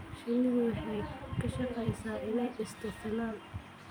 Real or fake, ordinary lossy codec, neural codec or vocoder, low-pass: fake; none; vocoder, 44.1 kHz, 128 mel bands every 256 samples, BigVGAN v2; none